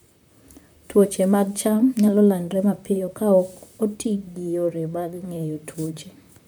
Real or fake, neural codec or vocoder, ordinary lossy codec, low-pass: fake; vocoder, 44.1 kHz, 128 mel bands, Pupu-Vocoder; none; none